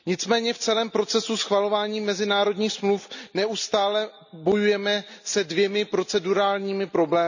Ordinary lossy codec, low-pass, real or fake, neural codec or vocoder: none; 7.2 kHz; real; none